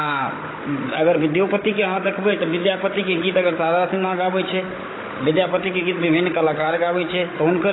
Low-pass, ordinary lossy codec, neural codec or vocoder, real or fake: 7.2 kHz; AAC, 16 kbps; codec, 16 kHz, 16 kbps, FunCodec, trained on Chinese and English, 50 frames a second; fake